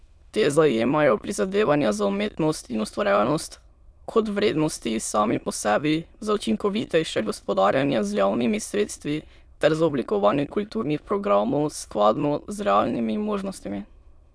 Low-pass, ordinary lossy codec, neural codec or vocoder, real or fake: none; none; autoencoder, 22.05 kHz, a latent of 192 numbers a frame, VITS, trained on many speakers; fake